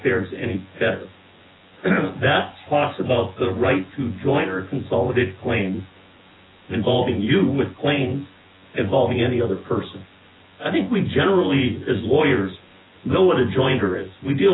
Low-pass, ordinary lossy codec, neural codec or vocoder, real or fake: 7.2 kHz; AAC, 16 kbps; vocoder, 24 kHz, 100 mel bands, Vocos; fake